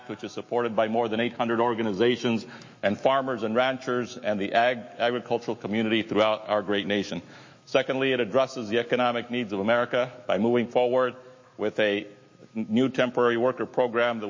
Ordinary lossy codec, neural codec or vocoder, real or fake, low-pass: MP3, 32 kbps; autoencoder, 48 kHz, 128 numbers a frame, DAC-VAE, trained on Japanese speech; fake; 7.2 kHz